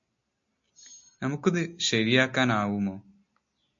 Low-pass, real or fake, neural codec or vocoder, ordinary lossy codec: 7.2 kHz; real; none; MP3, 48 kbps